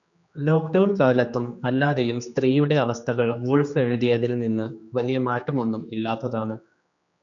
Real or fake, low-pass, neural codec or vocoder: fake; 7.2 kHz; codec, 16 kHz, 2 kbps, X-Codec, HuBERT features, trained on general audio